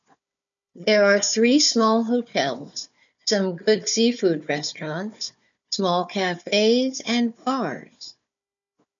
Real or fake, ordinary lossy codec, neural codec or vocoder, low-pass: fake; MP3, 96 kbps; codec, 16 kHz, 4 kbps, FunCodec, trained on Chinese and English, 50 frames a second; 7.2 kHz